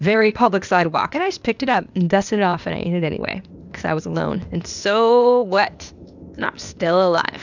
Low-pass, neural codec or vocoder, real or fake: 7.2 kHz; codec, 16 kHz, 0.8 kbps, ZipCodec; fake